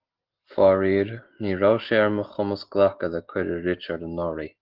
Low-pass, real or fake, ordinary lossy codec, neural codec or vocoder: 5.4 kHz; real; Opus, 16 kbps; none